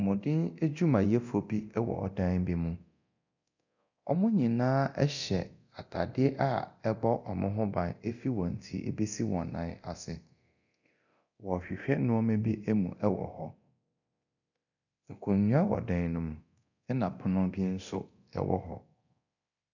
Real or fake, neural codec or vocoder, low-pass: fake; codec, 24 kHz, 0.9 kbps, DualCodec; 7.2 kHz